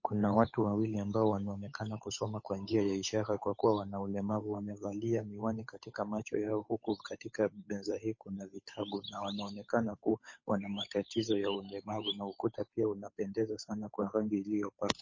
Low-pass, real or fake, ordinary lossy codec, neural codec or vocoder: 7.2 kHz; fake; MP3, 32 kbps; codec, 16 kHz, 8 kbps, FunCodec, trained on Chinese and English, 25 frames a second